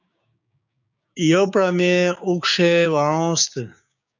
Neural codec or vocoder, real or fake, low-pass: codec, 16 kHz, 6 kbps, DAC; fake; 7.2 kHz